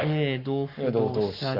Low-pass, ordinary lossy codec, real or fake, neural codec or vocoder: 5.4 kHz; none; fake; codec, 44.1 kHz, 7.8 kbps, Pupu-Codec